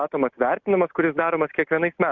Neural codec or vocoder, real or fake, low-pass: none; real; 7.2 kHz